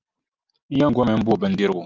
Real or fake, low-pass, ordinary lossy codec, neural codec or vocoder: real; 7.2 kHz; Opus, 24 kbps; none